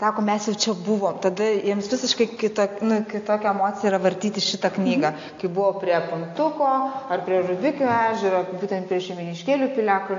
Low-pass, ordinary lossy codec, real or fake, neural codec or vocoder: 7.2 kHz; AAC, 48 kbps; real; none